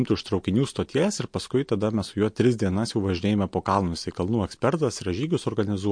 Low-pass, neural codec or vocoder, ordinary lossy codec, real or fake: 9.9 kHz; none; MP3, 48 kbps; real